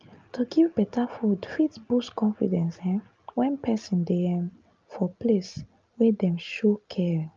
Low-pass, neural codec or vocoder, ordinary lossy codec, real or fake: 7.2 kHz; none; Opus, 24 kbps; real